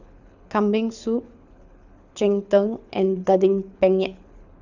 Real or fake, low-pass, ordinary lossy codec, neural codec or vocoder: fake; 7.2 kHz; none; codec, 24 kHz, 6 kbps, HILCodec